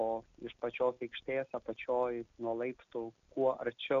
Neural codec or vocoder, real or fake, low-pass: none; real; 7.2 kHz